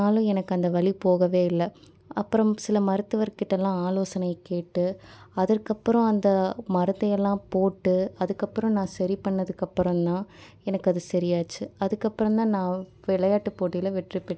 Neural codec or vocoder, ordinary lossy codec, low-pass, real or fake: none; none; none; real